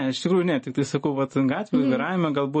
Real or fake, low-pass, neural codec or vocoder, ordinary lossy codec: real; 9.9 kHz; none; MP3, 32 kbps